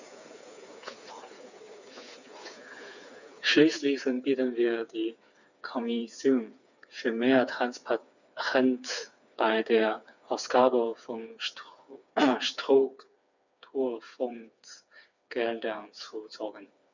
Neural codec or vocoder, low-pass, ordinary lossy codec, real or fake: codec, 16 kHz, 4 kbps, FreqCodec, smaller model; 7.2 kHz; none; fake